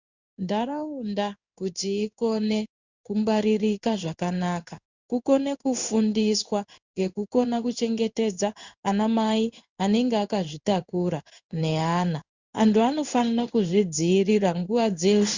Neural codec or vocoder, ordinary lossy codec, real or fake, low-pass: codec, 16 kHz in and 24 kHz out, 1 kbps, XY-Tokenizer; Opus, 64 kbps; fake; 7.2 kHz